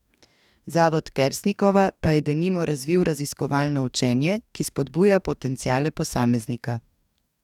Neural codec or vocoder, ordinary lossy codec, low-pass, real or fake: codec, 44.1 kHz, 2.6 kbps, DAC; none; 19.8 kHz; fake